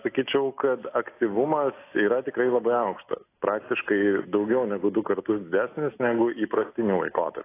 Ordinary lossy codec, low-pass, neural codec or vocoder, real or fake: AAC, 24 kbps; 3.6 kHz; none; real